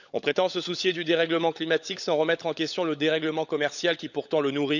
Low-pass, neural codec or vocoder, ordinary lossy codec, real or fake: 7.2 kHz; codec, 16 kHz, 16 kbps, FunCodec, trained on LibriTTS, 50 frames a second; none; fake